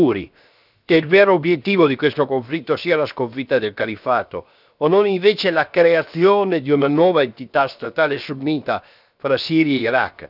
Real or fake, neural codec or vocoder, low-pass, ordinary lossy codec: fake; codec, 16 kHz, 0.7 kbps, FocalCodec; 5.4 kHz; none